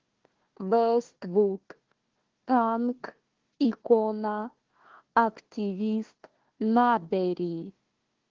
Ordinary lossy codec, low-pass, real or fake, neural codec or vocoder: Opus, 16 kbps; 7.2 kHz; fake; codec, 16 kHz, 1 kbps, FunCodec, trained on Chinese and English, 50 frames a second